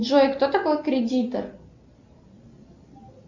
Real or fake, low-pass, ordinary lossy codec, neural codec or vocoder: real; 7.2 kHz; Opus, 64 kbps; none